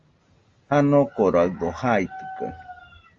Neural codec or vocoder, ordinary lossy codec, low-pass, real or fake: none; Opus, 32 kbps; 7.2 kHz; real